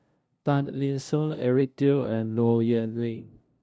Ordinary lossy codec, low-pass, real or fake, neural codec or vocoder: none; none; fake; codec, 16 kHz, 0.5 kbps, FunCodec, trained on LibriTTS, 25 frames a second